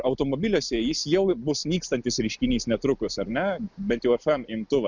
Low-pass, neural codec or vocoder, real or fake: 7.2 kHz; none; real